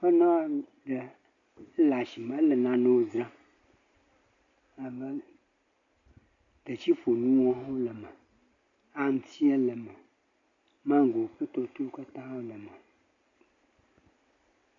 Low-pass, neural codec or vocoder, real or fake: 7.2 kHz; none; real